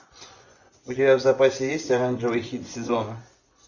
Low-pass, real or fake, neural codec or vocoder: 7.2 kHz; fake; vocoder, 24 kHz, 100 mel bands, Vocos